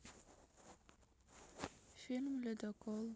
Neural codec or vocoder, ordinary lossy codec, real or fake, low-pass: none; none; real; none